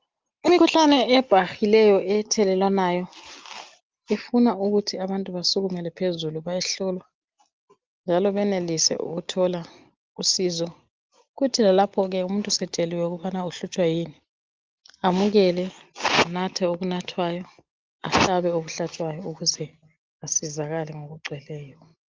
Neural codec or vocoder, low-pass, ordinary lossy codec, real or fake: none; 7.2 kHz; Opus, 24 kbps; real